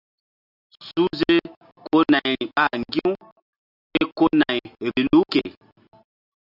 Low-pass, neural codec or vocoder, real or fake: 5.4 kHz; none; real